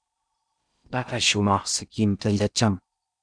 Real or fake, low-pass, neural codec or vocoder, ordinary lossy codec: fake; 9.9 kHz; codec, 16 kHz in and 24 kHz out, 0.6 kbps, FocalCodec, streaming, 2048 codes; MP3, 96 kbps